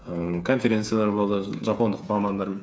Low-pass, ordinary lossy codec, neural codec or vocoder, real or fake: none; none; codec, 16 kHz, 8 kbps, FreqCodec, smaller model; fake